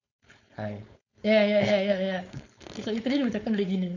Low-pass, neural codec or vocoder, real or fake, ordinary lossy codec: 7.2 kHz; codec, 16 kHz, 4.8 kbps, FACodec; fake; none